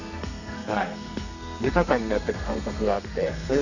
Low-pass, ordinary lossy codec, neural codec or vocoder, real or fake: 7.2 kHz; none; codec, 32 kHz, 1.9 kbps, SNAC; fake